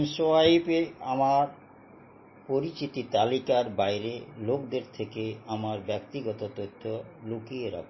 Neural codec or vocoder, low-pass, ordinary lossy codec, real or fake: none; 7.2 kHz; MP3, 24 kbps; real